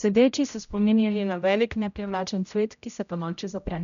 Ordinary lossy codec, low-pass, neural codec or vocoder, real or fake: none; 7.2 kHz; codec, 16 kHz, 0.5 kbps, X-Codec, HuBERT features, trained on general audio; fake